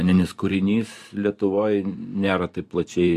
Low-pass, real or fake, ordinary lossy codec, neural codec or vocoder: 14.4 kHz; fake; MP3, 64 kbps; vocoder, 44.1 kHz, 128 mel bands every 512 samples, BigVGAN v2